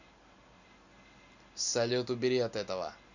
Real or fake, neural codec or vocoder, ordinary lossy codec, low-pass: real; none; none; 7.2 kHz